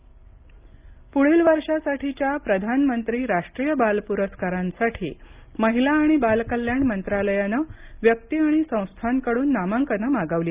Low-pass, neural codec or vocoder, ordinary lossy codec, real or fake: 3.6 kHz; none; Opus, 24 kbps; real